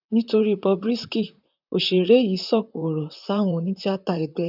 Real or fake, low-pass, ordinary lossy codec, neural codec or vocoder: fake; 5.4 kHz; none; vocoder, 44.1 kHz, 128 mel bands, Pupu-Vocoder